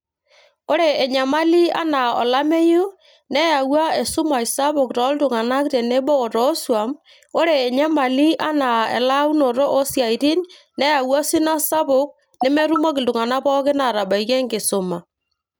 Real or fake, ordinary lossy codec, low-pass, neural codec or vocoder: real; none; none; none